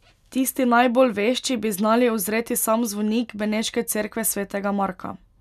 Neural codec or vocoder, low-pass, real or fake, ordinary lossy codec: none; 14.4 kHz; real; none